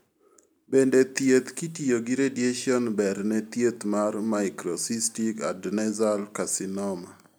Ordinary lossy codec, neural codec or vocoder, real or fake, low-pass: none; none; real; none